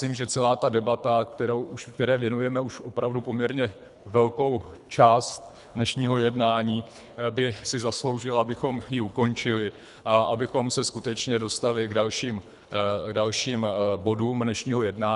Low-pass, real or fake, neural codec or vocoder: 10.8 kHz; fake; codec, 24 kHz, 3 kbps, HILCodec